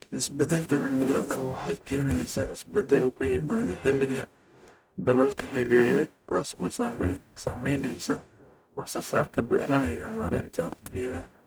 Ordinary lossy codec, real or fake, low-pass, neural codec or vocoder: none; fake; none; codec, 44.1 kHz, 0.9 kbps, DAC